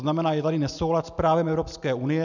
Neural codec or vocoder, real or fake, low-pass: none; real; 7.2 kHz